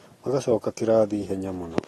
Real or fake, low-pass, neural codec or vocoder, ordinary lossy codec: real; 19.8 kHz; none; AAC, 32 kbps